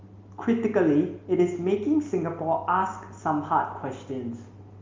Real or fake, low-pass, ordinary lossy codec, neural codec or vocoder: real; 7.2 kHz; Opus, 32 kbps; none